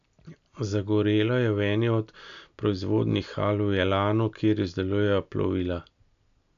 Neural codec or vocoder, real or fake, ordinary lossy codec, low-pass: none; real; none; 7.2 kHz